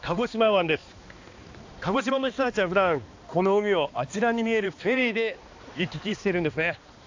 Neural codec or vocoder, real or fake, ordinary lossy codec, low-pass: codec, 16 kHz, 2 kbps, X-Codec, HuBERT features, trained on balanced general audio; fake; AAC, 48 kbps; 7.2 kHz